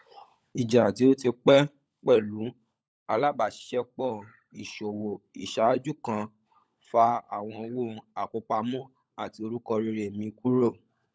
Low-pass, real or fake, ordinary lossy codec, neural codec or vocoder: none; fake; none; codec, 16 kHz, 16 kbps, FunCodec, trained on LibriTTS, 50 frames a second